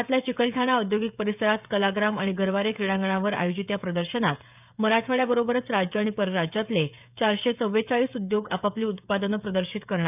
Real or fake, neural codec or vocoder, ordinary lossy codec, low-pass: fake; codec, 16 kHz, 16 kbps, FreqCodec, smaller model; none; 3.6 kHz